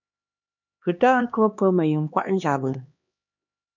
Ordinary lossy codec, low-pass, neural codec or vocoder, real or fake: MP3, 64 kbps; 7.2 kHz; codec, 16 kHz, 2 kbps, X-Codec, HuBERT features, trained on LibriSpeech; fake